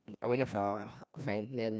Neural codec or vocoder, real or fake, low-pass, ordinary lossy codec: codec, 16 kHz, 1 kbps, FreqCodec, larger model; fake; none; none